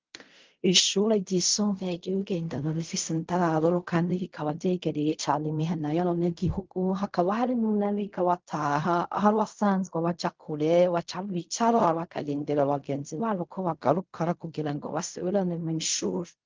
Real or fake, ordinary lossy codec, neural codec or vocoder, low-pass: fake; Opus, 32 kbps; codec, 16 kHz in and 24 kHz out, 0.4 kbps, LongCat-Audio-Codec, fine tuned four codebook decoder; 7.2 kHz